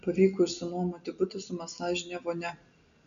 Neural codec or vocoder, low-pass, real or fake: none; 7.2 kHz; real